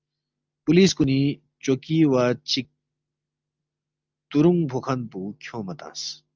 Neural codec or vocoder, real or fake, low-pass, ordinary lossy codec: none; real; 7.2 kHz; Opus, 24 kbps